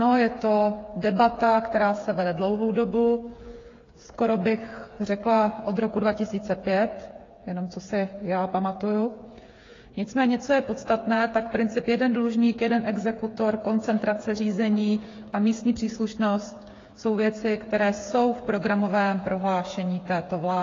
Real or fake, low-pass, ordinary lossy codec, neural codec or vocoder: fake; 7.2 kHz; AAC, 32 kbps; codec, 16 kHz, 8 kbps, FreqCodec, smaller model